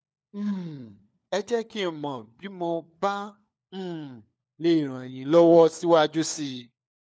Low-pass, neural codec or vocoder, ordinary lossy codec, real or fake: none; codec, 16 kHz, 4 kbps, FunCodec, trained on LibriTTS, 50 frames a second; none; fake